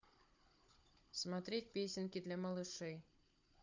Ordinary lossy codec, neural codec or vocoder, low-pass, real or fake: MP3, 48 kbps; codec, 16 kHz, 16 kbps, FunCodec, trained on Chinese and English, 50 frames a second; 7.2 kHz; fake